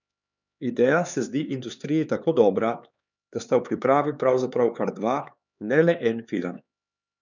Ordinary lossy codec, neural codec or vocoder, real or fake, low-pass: none; codec, 16 kHz, 4 kbps, X-Codec, HuBERT features, trained on LibriSpeech; fake; 7.2 kHz